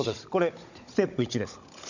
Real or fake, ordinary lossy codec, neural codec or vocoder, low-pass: fake; none; codec, 16 kHz, 16 kbps, FunCodec, trained on Chinese and English, 50 frames a second; 7.2 kHz